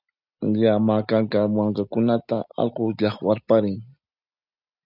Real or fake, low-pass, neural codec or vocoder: real; 5.4 kHz; none